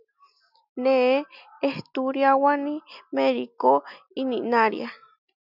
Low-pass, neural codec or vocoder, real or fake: 5.4 kHz; none; real